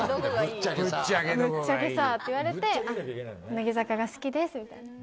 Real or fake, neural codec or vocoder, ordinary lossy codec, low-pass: real; none; none; none